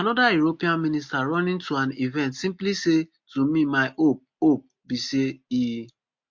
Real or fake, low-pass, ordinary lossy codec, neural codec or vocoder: real; 7.2 kHz; MP3, 48 kbps; none